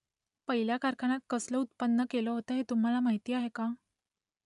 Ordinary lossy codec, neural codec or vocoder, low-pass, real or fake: none; none; 10.8 kHz; real